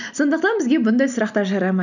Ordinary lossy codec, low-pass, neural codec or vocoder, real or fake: none; 7.2 kHz; none; real